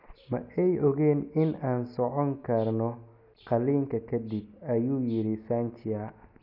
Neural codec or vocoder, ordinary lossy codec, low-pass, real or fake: none; none; 5.4 kHz; real